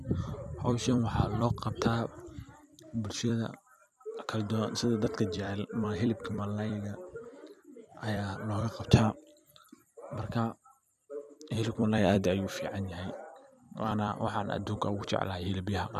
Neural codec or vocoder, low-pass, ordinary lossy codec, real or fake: vocoder, 44.1 kHz, 128 mel bands every 256 samples, BigVGAN v2; 14.4 kHz; none; fake